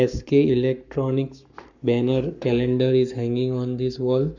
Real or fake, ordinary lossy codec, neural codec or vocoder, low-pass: fake; none; codec, 44.1 kHz, 7.8 kbps, Pupu-Codec; 7.2 kHz